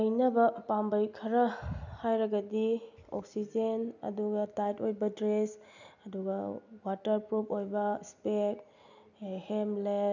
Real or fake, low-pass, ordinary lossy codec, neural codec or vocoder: real; 7.2 kHz; none; none